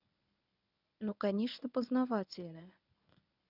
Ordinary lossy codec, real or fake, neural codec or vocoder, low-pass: none; fake; codec, 24 kHz, 0.9 kbps, WavTokenizer, medium speech release version 1; 5.4 kHz